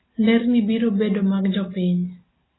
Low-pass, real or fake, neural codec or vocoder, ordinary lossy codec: 7.2 kHz; real; none; AAC, 16 kbps